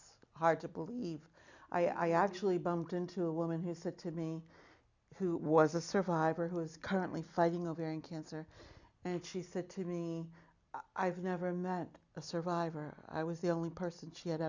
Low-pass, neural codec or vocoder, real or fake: 7.2 kHz; none; real